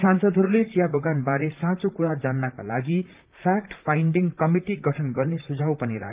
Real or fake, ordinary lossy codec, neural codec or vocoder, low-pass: fake; Opus, 32 kbps; vocoder, 44.1 kHz, 128 mel bands, Pupu-Vocoder; 3.6 kHz